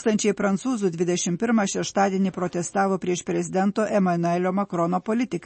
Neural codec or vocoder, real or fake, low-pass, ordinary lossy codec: none; real; 10.8 kHz; MP3, 32 kbps